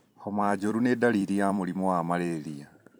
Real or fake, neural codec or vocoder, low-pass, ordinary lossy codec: fake; vocoder, 44.1 kHz, 128 mel bands every 256 samples, BigVGAN v2; none; none